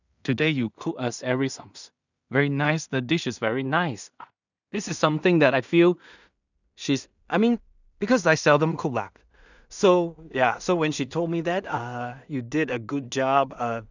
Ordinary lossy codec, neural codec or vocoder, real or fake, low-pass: none; codec, 16 kHz in and 24 kHz out, 0.4 kbps, LongCat-Audio-Codec, two codebook decoder; fake; 7.2 kHz